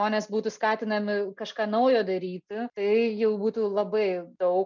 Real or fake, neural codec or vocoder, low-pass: real; none; 7.2 kHz